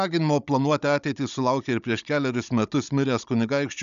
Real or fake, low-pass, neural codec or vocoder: fake; 7.2 kHz; codec, 16 kHz, 16 kbps, FunCodec, trained on Chinese and English, 50 frames a second